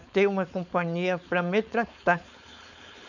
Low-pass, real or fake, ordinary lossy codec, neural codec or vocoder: 7.2 kHz; fake; none; codec, 16 kHz, 4.8 kbps, FACodec